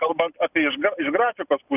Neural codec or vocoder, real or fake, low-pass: none; real; 3.6 kHz